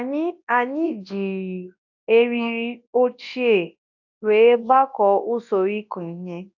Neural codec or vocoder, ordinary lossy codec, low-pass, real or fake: codec, 24 kHz, 0.9 kbps, WavTokenizer, large speech release; AAC, 48 kbps; 7.2 kHz; fake